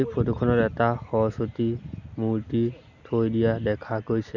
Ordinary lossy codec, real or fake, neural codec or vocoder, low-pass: none; real; none; 7.2 kHz